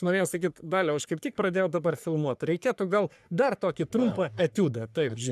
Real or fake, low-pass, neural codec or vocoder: fake; 14.4 kHz; codec, 44.1 kHz, 3.4 kbps, Pupu-Codec